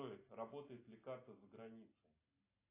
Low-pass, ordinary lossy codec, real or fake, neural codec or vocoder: 3.6 kHz; AAC, 24 kbps; real; none